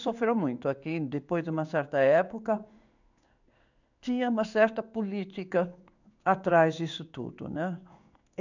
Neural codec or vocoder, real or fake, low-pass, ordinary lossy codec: codec, 16 kHz in and 24 kHz out, 1 kbps, XY-Tokenizer; fake; 7.2 kHz; none